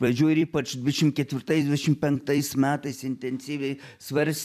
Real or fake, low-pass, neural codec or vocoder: fake; 14.4 kHz; vocoder, 44.1 kHz, 128 mel bands every 256 samples, BigVGAN v2